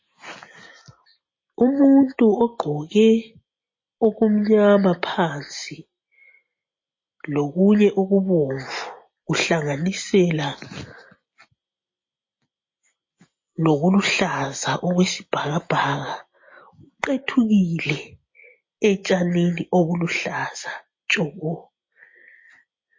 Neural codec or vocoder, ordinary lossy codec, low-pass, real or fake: none; MP3, 32 kbps; 7.2 kHz; real